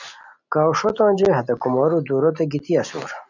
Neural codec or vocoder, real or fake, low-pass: none; real; 7.2 kHz